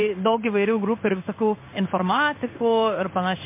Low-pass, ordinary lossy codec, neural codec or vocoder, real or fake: 3.6 kHz; MP3, 24 kbps; codec, 16 kHz in and 24 kHz out, 1 kbps, XY-Tokenizer; fake